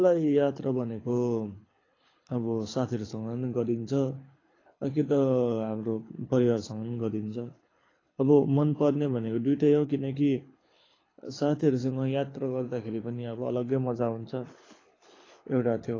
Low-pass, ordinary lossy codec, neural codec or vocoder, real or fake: 7.2 kHz; AAC, 32 kbps; codec, 24 kHz, 6 kbps, HILCodec; fake